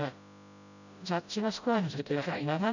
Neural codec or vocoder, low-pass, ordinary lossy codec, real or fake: codec, 16 kHz, 0.5 kbps, FreqCodec, smaller model; 7.2 kHz; none; fake